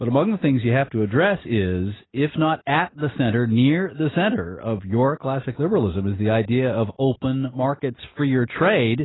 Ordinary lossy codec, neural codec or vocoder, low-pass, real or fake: AAC, 16 kbps; none; 7.2 kHz; real